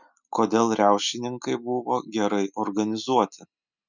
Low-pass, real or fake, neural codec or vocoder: 7.2 kHz; real; none